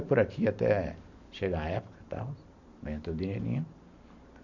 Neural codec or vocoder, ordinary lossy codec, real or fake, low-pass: vocoder, 44.1 kHz, 128 mel bands every 512 samples, BigVGAN v2; none; fake; 7.2 kHz